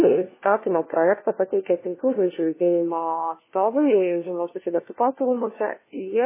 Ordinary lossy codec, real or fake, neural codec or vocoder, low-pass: MP3, 16 kbps; fake; codec, 16 kHz, 1 kbps, FunCodec, trained on LibriTTS, 50 frames a second; 3.6 kHz